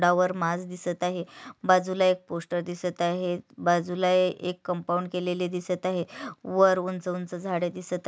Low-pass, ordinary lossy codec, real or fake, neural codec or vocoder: none; none; real; none